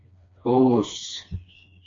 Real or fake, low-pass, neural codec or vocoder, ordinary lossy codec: fake; 7.2 kHz; codec, 16 kHz, 2 kbps, FreqCodec, smaller model; AAC, 48 kbps